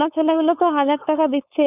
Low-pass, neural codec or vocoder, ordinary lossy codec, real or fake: 3.6 kHz; codec, 16 kHz, 4.8 kbps, FACodec; none; fake